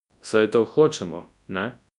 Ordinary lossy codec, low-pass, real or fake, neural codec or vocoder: none; 10.8 kHz; fake; codec, 24 kHz, 0.9 kbps, WavTokenizer, large speech release